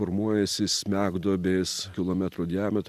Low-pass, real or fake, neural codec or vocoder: 14.4 kHz; real; none